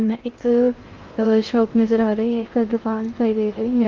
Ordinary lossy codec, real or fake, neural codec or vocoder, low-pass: Opus, 32 kbps; fake; codec, 16 kHz in and 24 kHz out, 0.6 kbps, FocalCodec, streaming, 2048 codes; 7.2 kHz